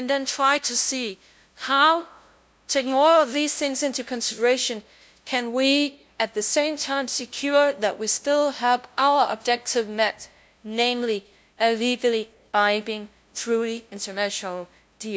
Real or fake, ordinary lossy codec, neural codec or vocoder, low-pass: fake; none; codec, 16 kHz, 0.5 kbps, FunCodec, trained on LibriTTS, 25 frames a second; none